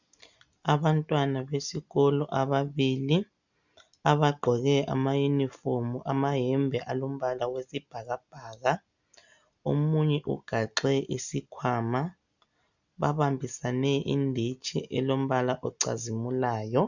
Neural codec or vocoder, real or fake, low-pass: none; real; 7.2 kHz